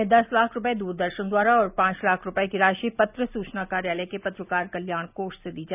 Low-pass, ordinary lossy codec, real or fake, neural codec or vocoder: 3.6 kHz; none; real; none